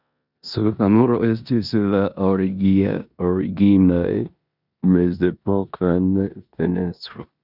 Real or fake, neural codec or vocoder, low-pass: fake; codec, 16 kHz in and 24 kHz out, 0.9 kbps, LongCat-Audio-Codec, four codebook decoder; 5.4 kHz